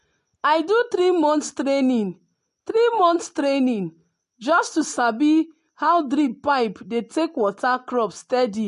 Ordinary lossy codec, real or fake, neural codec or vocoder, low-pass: MP3, 48 kbps; real; none; 14.4 kHz